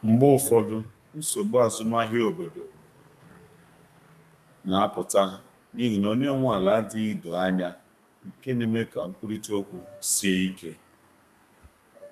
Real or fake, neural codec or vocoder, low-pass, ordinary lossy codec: fake; codec, 32 kHz, 1.9 kbps, SNAC; 14.4 kHz; none